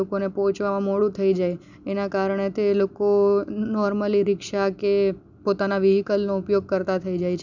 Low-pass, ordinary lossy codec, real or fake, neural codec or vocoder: 7.2 kHz; none; real; none